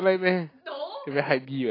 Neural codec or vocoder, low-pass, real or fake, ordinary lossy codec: none; 5.4 kHz; real; AAC, 24 kbps